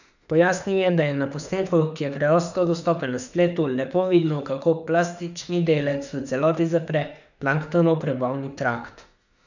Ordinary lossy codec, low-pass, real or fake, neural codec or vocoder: none; 7.2 kHz; fake; autoencoder, 48 kHz, 32 numbers a frame, DAC-VAE, trained on Japanese speech